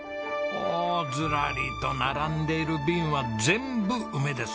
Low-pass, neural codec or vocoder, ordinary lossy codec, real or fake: none; none; none; real